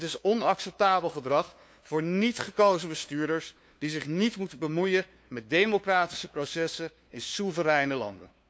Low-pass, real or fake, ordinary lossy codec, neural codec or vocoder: none; fake; none; codec, 16 kHz, 2 kbps, FunCodec, trained on LibriTTS, 25 frames a second